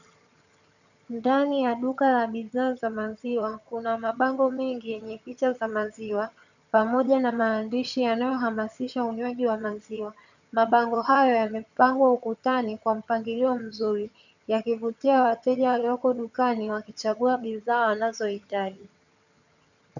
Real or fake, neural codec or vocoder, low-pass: fake; vocoder, 22.05 kHz, 80 mel bands, HiFi-GAN; 7.2 kHz